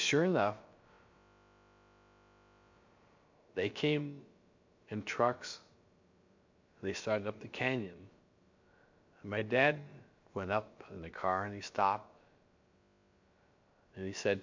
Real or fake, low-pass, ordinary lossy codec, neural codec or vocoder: fake; 7.2 kHz; MP3, 48 kbps; codec, 16 kHz, about 1 kbps, DyCAST, with the encoder's durations